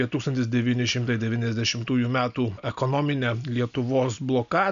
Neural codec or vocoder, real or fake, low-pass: none; real; 7.2 kHz